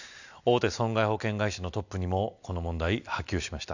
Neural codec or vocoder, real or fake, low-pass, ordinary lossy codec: none; real; 7.2 kHz; none